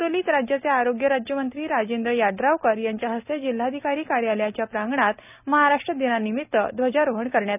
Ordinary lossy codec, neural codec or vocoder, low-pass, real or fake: none; none; 3.6 kHz; real